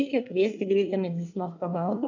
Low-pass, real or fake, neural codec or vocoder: 7.2 kHz; fake; codec, 24 kHz, 1 kbps, SNAC